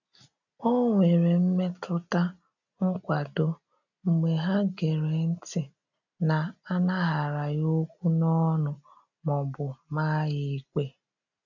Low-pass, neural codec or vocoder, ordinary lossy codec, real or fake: 7.2 kHz; none; none; real